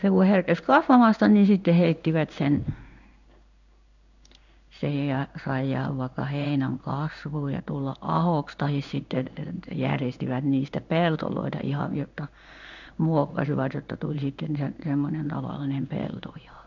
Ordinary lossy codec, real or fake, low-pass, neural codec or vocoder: none; fake; 7.2 kHz; codec, 16 kHz in and 24 kHz out, 1 kbps, XY-Tokenizer